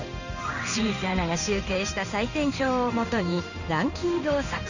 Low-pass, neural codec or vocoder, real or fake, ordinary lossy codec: 7.2 kHz; codec, 16 kHz in and 24 kHz out, 1 kbps, XY-Tokenizer; fake; none